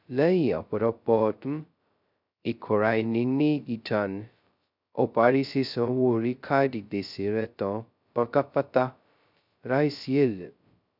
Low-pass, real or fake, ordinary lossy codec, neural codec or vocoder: 5.4 kHz; fake; none; codec, 16 kHz, 0.2 kbps, FocalCodec